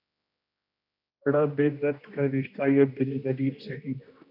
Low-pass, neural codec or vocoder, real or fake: 5.4 kHz; codec, 16 kHz, 1 kbps, X-Codec, HuBERT features, trained on general audio; fake